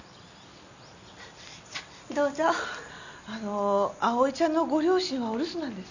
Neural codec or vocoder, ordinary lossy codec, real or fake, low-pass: none; none; real; 7.2 kHz